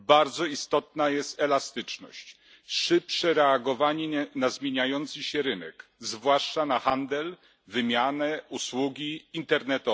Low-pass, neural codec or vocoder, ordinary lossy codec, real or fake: none; none; none; real